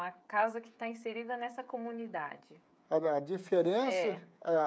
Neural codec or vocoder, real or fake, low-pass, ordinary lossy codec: codec, 16 kHz, 16 kbps, FreqCodec, smaller model; fake; none; none